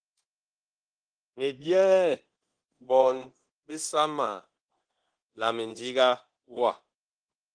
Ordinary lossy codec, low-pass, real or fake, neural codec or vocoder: Opus, 16 kbps; 9.9 kHz; fake; codec, 24 kHz, 0.9 kbps, DualCodec